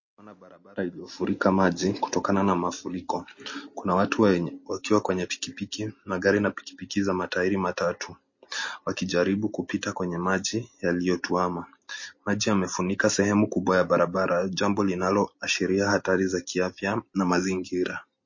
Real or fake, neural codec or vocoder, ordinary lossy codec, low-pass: real; none; MP3, 32 kbps; 7.2 kHz